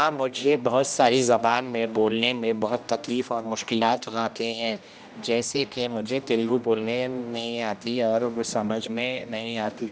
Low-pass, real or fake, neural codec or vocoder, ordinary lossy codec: none; fake; codec, 16 kHz, 1 kbps, X-Codec, HuBERT features, trained on general audio; none